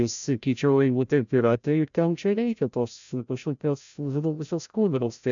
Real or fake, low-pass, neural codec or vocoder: fake; 7.2 kHz; codec, 16 kHz, 0.5 kbps, FreqCodec, larger model